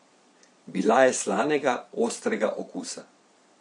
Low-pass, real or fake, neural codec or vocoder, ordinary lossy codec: 9.9 kHz; fake; vocoder, 22.05 kHz, 80 mel bands, Vocos; MP3, 48 kbps